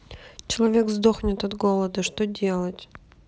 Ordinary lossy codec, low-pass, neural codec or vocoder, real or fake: none; none; none; real